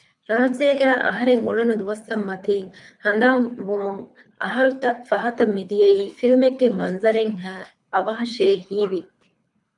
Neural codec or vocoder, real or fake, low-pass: codec, 24 kHz, 3 kbps, HILCodec; fake; 10.8 kHz